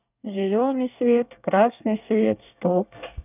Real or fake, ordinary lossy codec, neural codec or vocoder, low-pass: fake; none; codec, 24 kHz, 1 kbps, SNAC; 3.6 kHz